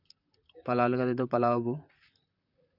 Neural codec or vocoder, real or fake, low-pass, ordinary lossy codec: vocoder, 44.1 kHz, 128 mel bands, Pupu-Vocoder; fake; 5.4 kHz; none